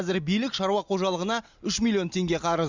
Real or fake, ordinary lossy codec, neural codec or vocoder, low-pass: real; Opus, 64 kbps; none; 7.2 kHz